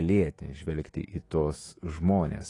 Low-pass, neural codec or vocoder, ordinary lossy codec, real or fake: 10.8 kHz; autoencoder, 48 kHz, 128 numbers a frame, DAC-VAE, trained on Japanese speech; AAC, 32 kbps; fake